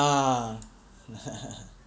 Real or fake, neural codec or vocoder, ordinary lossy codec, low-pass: real; none; none; none